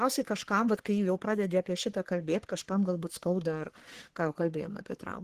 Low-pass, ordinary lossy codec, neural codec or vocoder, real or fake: 14.4 kHz; Opus, 16 kbps; codec, 44.1 kHz, 3.4 kbps, Pupu-Codec; fake